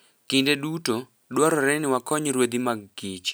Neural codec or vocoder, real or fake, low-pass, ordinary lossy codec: none; real; none; none